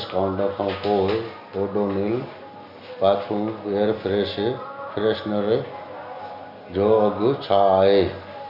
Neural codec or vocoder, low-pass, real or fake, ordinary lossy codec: none; 5.4 kHz; real; none